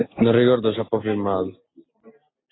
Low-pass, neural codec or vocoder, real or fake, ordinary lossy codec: 7.2 kHz; none; real; AAC, 16 kbps